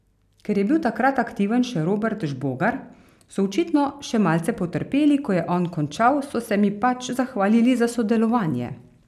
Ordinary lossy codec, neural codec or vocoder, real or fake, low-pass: none; none; real; 14.4 kHz